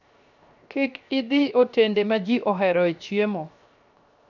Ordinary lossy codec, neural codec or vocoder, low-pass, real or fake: none; codec, 16 kHz, 0.7 kbps, FocalCodec; 7.2 kHz; fake